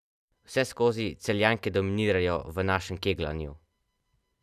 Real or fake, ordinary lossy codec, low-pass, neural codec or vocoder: real; none; 14.4 kHz; none